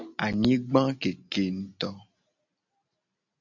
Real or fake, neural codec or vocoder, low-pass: real; none; 7.2 kHz